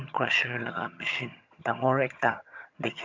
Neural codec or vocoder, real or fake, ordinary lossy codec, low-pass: vocoder, 22.05 kHz, 80 mel bands, HiFi-GAN; fake; AAC, 48 kbps; 7.2 kHz